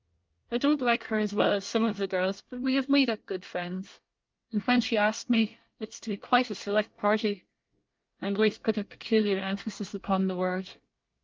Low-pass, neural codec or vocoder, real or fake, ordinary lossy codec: 7.2 kHz; codec, 24 kHz, 1 kbps, SNAC; fake; Opus, 24 kbps